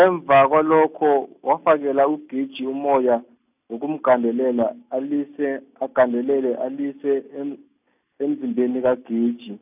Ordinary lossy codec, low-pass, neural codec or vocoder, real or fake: none; 3.6 kHz; none; real